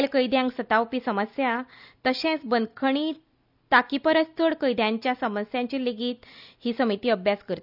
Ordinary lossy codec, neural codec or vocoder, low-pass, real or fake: none; none; 5.4 kHz; real